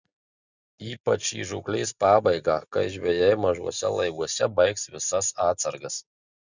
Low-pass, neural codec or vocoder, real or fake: 7.2 kHz; none; real